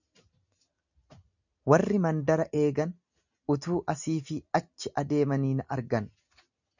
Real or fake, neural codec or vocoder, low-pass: real; none; 7.2 kHz